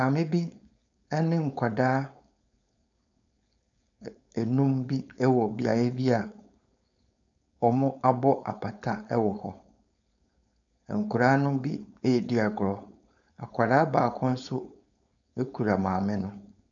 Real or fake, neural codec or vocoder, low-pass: fake; codec, 16 kHz, 4.8 kbps, FACodec; 7.2 kHz